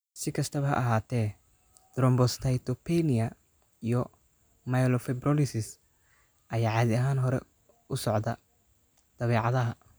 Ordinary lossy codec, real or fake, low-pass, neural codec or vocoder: none; real; none; none